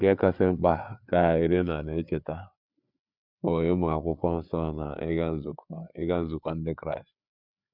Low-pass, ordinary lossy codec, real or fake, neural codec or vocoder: 5.4 kHz; none; fake; codec, 16 kHz, 4 kbps, FreqCodec, larger model